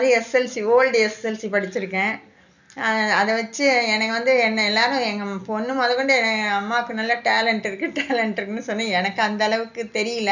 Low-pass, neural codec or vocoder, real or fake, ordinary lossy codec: 7.2 kHz; none; real; none